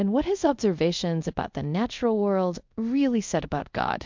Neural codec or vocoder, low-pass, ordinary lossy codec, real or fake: codec, 24 kHz, 0.5 kbps, DualCodec; 7.2 kHz; MP3, 64 kbps; fake